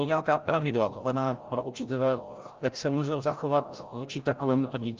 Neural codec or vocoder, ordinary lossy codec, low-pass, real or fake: codec, 16 kHz, 0.5 kbps, FreqCodec, larger model; Opus, 32 kbps; 7.2 kHz; fake